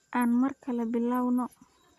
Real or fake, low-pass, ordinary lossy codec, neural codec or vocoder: real; 14.4 kHz; Opus, 64 kbps; none